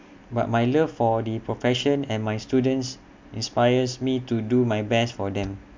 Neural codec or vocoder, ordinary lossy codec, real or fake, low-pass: none; MP3, 64 kbps; real; 7.2 kHz